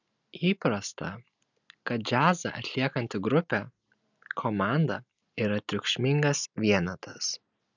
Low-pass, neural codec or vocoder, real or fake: 7.2 kHz; none; real